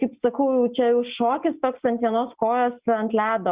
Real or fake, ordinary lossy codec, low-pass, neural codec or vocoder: real; Opus, 64 kbps; 3.6 kHz; none